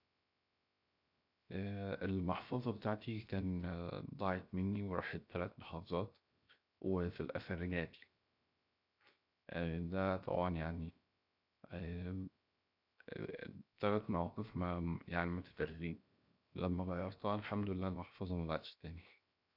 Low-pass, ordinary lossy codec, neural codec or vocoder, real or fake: 5.4 kHz; none; codec, 16 kHz, 0.7 kbps, FocalCodec; fake